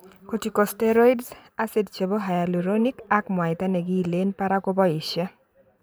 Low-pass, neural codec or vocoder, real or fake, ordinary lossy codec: none; vocoder, 44.1 kHz, 128 mel bands every 512 samples, BigVGAN v2; fake; none